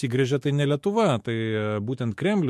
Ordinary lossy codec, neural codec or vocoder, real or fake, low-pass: MP3, 64 kbps; autoencoder, 48 kHz, 128 numbers a frame, DAC-VAE, trained on Japanese speech; fake; 14.4 kHz